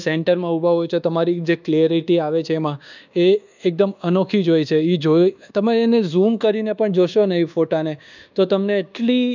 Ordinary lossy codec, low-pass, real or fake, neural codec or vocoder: none; 7.2 kHz; fake; codec, 24 kHz, 1.2 kbps, DualCodec